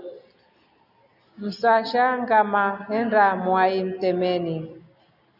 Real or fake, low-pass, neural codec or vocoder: real; 5.4 kHz; none